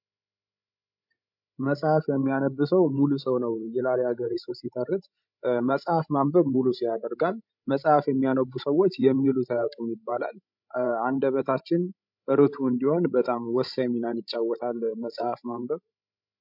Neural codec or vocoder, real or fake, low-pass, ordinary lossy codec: codec, 16 kHz, 16 kbps, FreqCodec, larger model; fake; 5.4 kHz; MP3, 48 kbps